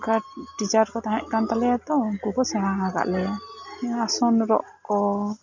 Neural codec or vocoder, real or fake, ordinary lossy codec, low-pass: none; real; none; 7.2 kHz